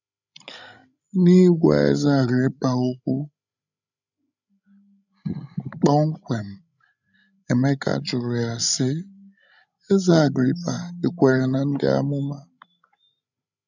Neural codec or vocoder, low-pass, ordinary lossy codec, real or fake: codec, 16 kHz, 16 kbps, FreqCodec, larger model; 7.2 kHz; none; fake